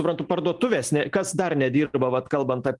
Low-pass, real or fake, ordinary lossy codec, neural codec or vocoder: 10.8 kHz; real; Opus, 24 kbps; none